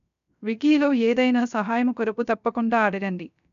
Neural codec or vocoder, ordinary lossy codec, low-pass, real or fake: codec, 16 kHz, 0.3 kbps, FocalCodec; none; 7.2 kHz; fake